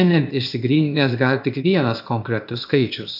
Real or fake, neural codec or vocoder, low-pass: fake; codec, 16 kHz, 0.8 kbps, ZipCodec; 5.4 kHz